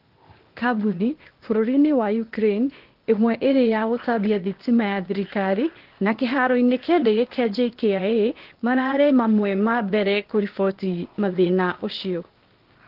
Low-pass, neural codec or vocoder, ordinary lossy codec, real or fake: 5.4 kHz; codec, 16 kHz, 0.8 kbps, ZipCodec; Opus, 16 kbps; fake